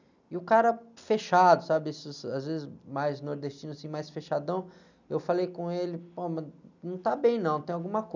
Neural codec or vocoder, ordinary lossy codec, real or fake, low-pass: none; none; real; 7.2 kHz